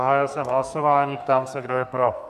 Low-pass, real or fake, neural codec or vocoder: 14.4 kHz; fake; codec, 44.1 kHz, 2.6 kbps, SNAC